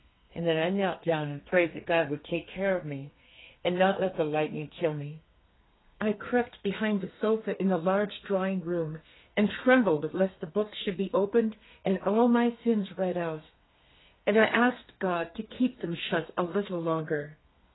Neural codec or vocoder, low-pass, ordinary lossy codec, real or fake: codec, 32 kHz, 1.9 kbps, SNAC; 7.2 kHz; AAC, 16 kbps; fake